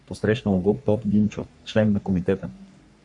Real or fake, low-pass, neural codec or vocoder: fake; 10.8 kHz; codec, 44.1 kHz, 3.4 kbps, Pupu-Codec